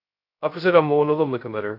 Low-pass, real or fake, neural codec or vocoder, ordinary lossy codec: 5.4 kHz; fake; codec, 16 kHz, 0.2 kbps, FocalCodec; AAC, 32 kbps